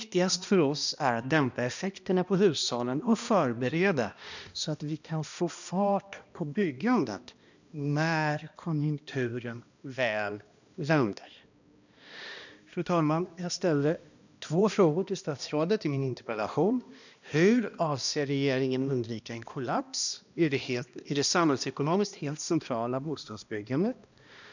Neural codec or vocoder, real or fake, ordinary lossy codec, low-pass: codec, 16 kHz, 1 kbps, X-Codec, HuBERT features, trained on balanced general audio; fake; none; 7.2 kHz